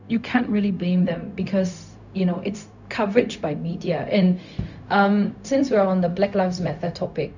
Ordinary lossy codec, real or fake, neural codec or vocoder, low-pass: none; fake; codec, 16 kHz, 0.4 kbps, LongCat-Audio-Codec; 7.2 kHz